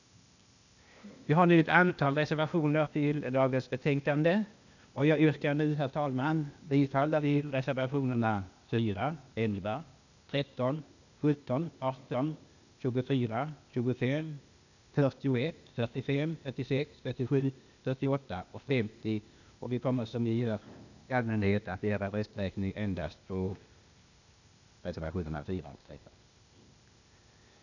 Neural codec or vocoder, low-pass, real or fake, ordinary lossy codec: codec, 16 kHz, 0.8 kbps, ZipCodec; 7.2 kHz; fake; none